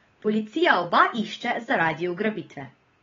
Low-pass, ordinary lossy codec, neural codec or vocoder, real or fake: 7.2 kHz; AAC, 24 kbps; codec, 16 kHz, 8 kbps, FunCodec, trained on Chinese and English, 25 frames a second; fake